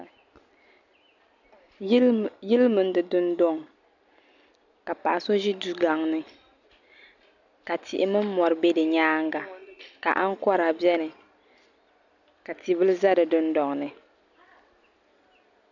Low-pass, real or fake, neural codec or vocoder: 7.2 kHz; real; none